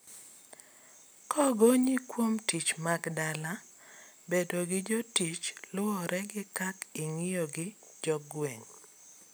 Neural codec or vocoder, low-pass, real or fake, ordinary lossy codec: none; none; real; none